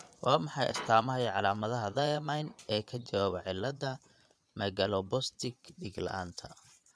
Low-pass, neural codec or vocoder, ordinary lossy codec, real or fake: none; vocoder, 22.05 kHz, 80 mel bands, Vocos; none; fake